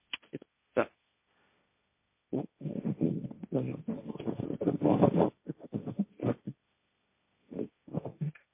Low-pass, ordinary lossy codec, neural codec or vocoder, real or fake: 3.6 kHz; MP3, 24 kbps; codec, 16 kHz, 1.1 kbps, Voila-Tokenizer; fake